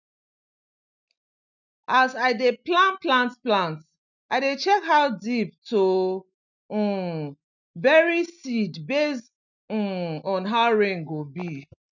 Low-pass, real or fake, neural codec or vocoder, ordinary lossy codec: 7.2 kHz; real; none; none